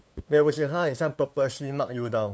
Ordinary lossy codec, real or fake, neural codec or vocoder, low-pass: none; fake; codec, 16 kHz, 2 kbps, FunCodec, trained on LibriTTS, 25 frames a second; none